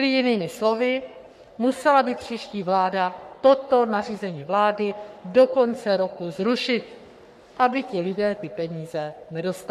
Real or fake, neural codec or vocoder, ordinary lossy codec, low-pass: fake; codec, 44.1 kHz, 3.4 kbps, Pupu-Codec; MP3, 96 kbps; 14.4 kHz